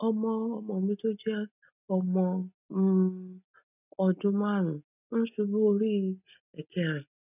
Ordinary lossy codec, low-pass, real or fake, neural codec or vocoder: none; 3.6 kHz; real; none